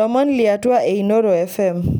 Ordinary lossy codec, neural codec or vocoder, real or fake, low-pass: none; none; real; none